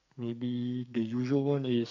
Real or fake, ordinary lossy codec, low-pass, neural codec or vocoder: fake; none; 7.2 kHz; codec, 44.1 kHz, 2.6 kbps, SNAC